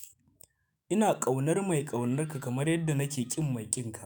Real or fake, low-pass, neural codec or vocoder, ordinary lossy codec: fake; none; autoencoder, 48 kHz, 128 numbers a frame, DAC-VAE, trained on Japanese speech; none